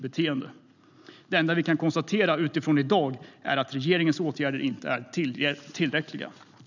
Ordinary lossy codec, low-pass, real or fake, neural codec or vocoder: none; 7.2 kHz; real; none